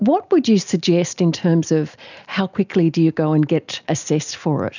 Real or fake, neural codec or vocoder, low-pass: real; none; 7.2 kHz